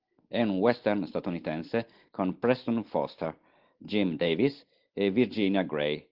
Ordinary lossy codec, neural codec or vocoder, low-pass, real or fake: Opus, 16 kbps; none; 5.4 kHz; real